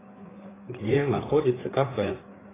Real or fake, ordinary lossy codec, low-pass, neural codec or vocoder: fake; AAC, 16 kbps; 3.6 kHz; codec, 16 kHz, 4 kbps, FreqCodec, larger model